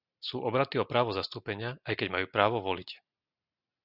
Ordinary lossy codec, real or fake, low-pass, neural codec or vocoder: Opus, 64 kbps; real; 5.4 kHz; none